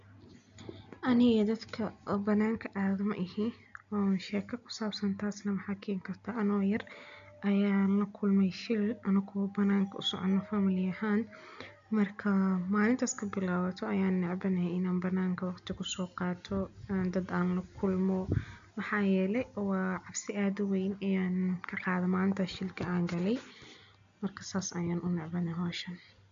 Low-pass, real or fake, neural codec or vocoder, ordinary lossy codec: 7.2 kHz; real; none; MP3, 64 kbps